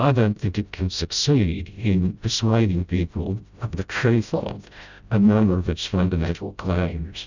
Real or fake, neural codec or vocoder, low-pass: fake; codec, 16 kHz, 0.5 kbps, FreqCodec, smaller model; 7.2 kHz